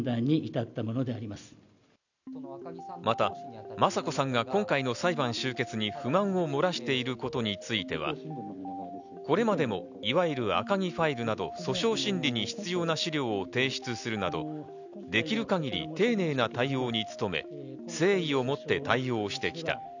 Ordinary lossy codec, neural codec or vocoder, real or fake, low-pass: none; none; real; 7.2 kHz